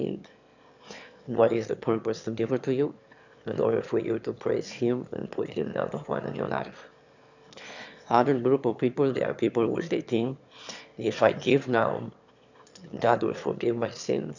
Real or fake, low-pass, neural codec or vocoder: fake; 7.2 kHz; autoencoder, 22.05 kHz, a latent of 192 numbers a frame, VITS, trained on one speaker